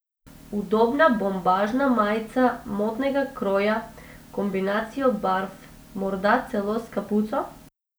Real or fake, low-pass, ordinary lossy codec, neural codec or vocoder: real; none; none; none